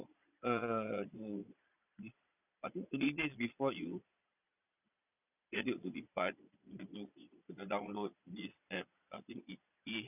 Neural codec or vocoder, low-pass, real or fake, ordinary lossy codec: vocoder, 22.05 kHz, 80 mel bands, Vocos; 3.6 kHz; fake; none